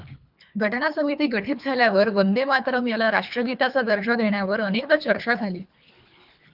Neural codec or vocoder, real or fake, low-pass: codec, 24 kHz, 3 kbps, HILCodec; fake; 5.4 kHz